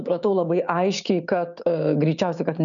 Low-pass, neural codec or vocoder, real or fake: 7.2 kHz; codec, 16 kHz, 6 kbps, DAC; fake